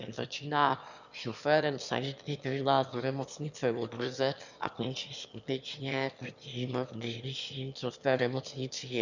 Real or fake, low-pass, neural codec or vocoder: fake; 7.2 kHz; autoencoder, 22.05 kHz, a latent of 192 numbers a frame, VITS, trained on one speaker